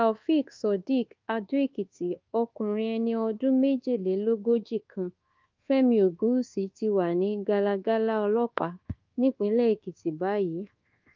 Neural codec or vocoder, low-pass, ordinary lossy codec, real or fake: codec, 24 kHz, 1.2 kbps, DualCodec; 7.2 kHz; Opus, 32 kbps; fake